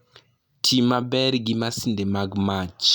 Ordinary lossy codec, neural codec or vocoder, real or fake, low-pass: none; none; real; none